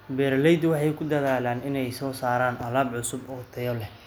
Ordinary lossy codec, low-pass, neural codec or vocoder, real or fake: none; none; none; real